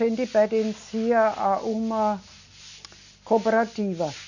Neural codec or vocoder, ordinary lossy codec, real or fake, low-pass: none; none; real; 7.2 kHz